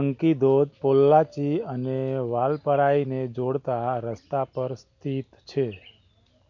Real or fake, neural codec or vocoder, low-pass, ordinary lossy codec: real; none; 7.2 kHz; none